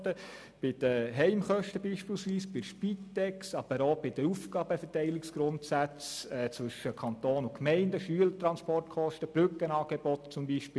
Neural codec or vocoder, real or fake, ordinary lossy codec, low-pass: none; real; none; none